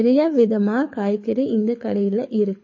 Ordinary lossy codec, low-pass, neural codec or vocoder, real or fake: MP3, 32 kbps; 7.2 kHz; codec, 24 kHz, 6 kbps, HILCodec; fake